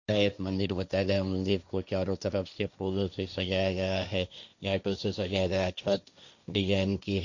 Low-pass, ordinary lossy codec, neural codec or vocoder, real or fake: 7.2 kHz; none; codec, 16 kHz, 1.1 kbps, Voila-Tokenizer; fake